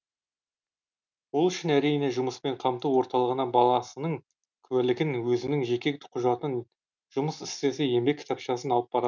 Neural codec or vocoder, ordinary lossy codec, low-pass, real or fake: none; none; 7.2 kHz; real